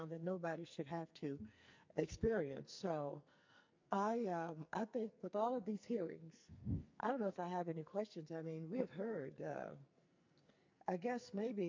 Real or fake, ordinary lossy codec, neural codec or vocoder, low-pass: fake; MP3, 48 kbps; codec, 44.1 kHz, 2.6 kbps, SNAC; 7.2 kHz